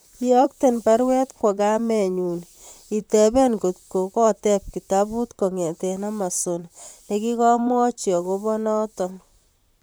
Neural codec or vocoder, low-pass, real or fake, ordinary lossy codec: vocoder, 44.1 kHz, 128 mel bands, Pupu-Vocoder; none; fake; none